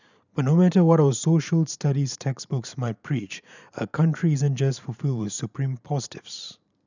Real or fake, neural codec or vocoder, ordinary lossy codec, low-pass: fake; vocoder, 44.1 kHz, 128 mel bands every 512 samples, BigVGAN v2; none; 7.2 kHz